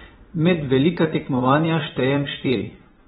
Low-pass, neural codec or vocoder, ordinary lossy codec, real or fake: 9.9 kHz; vocoder, 22.05 kHz, 80 mel bands, Vocos; AAC, 16 kbps; fake